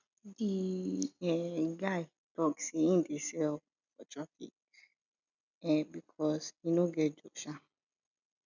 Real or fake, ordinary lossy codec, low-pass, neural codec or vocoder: real; none; 7.2 kHz; none